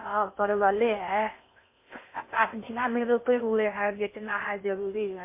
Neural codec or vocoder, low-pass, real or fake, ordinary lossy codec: codec, 16 kHz in and 24 kHz out, 0.6 kbps, FocalCodec, streaming, 4096 codes; 3.6 kHz; fake; MP3, 24 kbps